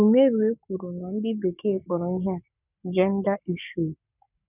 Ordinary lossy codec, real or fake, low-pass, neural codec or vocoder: none; fake; 3.6 kHz; codec, 44.1 kHz, 7.8 kbps, Pupu-Codec